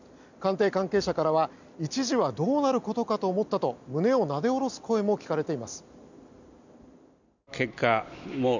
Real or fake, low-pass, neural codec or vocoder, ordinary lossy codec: real; 7.2 kHz; none; none